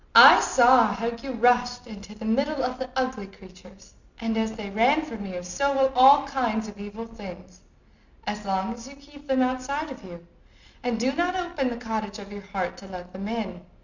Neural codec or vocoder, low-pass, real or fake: none; 7.2 kHz; real